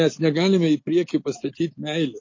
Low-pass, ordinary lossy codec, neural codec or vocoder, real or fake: 7.2 kHz; MP3, 32 kbps; none; real